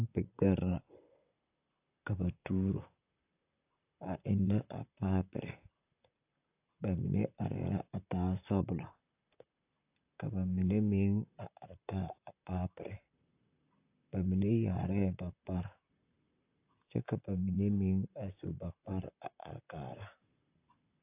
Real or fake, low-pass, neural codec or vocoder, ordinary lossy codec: fake; 3.6 kHz; vocoder, 44.1 kHz, 128 mel bands, Pupu-Vocoder; AAC, 32 kbps